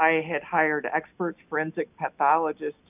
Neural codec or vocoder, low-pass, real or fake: none; 3.6 kHz; real